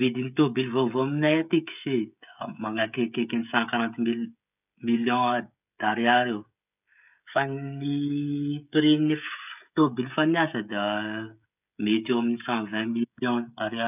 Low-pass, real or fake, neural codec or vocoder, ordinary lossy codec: 3.6 kHz; fake; codec, 16 kHz, 16 kbps, FreqCodec, smaller model; none